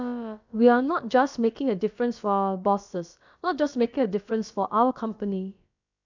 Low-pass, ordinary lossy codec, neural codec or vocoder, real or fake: 7.2 kHz; none; codec, 16 kHz, about 1 kbps, DyCAST, with the encoder's durations; fake